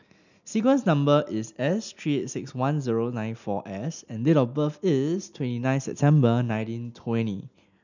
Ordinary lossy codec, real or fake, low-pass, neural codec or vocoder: none; real; 7.2 kHz; none